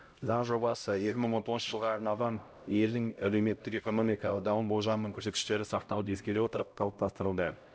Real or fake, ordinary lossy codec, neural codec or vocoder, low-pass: fake; none; codec, 16 kHz, 0.5 kbps, X-Codec, HuBERT features, trained on LibriSpeech; none